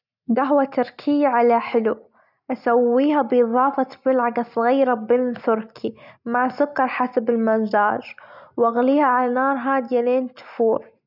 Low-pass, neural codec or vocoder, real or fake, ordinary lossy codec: 5.4 kHz; none; real; none